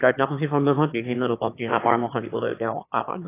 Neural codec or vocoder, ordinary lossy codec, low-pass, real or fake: autoencoder, 22.05 kHz, a latent of 192 numbers a frame, VITS, trained on one speaker; AAC, 24 kbps; 3.6 kHz; fake